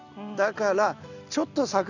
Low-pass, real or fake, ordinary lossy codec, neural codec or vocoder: 7.2 kHz; real; none; none